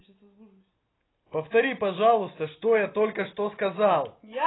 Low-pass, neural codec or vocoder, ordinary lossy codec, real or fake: 7.2 kHz; none; AAC, 16 kbps; real